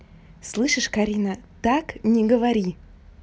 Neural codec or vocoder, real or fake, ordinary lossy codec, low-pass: none; real; none; none